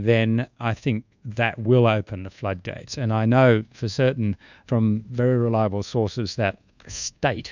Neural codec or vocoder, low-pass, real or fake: codec, 24 kHz, 1.2 kbps, DualCodec; 7.2 kHz; fake